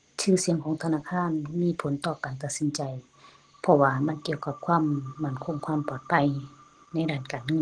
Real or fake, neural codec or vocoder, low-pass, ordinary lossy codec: real; none; 9.9 kHz; Opus, 16 kbps